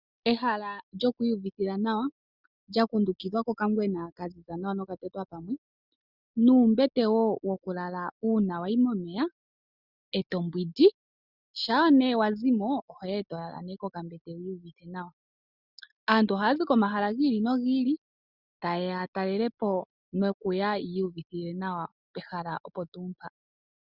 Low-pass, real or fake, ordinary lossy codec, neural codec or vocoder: 5.4 kHz; real; Opus, 64 kbps; none